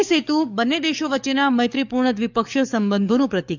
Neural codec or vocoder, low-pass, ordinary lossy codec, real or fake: codec, 44.1 kHz, 7.8 kbps, DAC; 7.2 kHz; none; fake